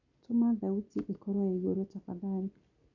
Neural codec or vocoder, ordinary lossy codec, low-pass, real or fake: none; none; 7.2 kHz; real